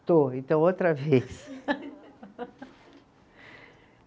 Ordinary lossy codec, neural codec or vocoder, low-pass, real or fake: none; none; none; real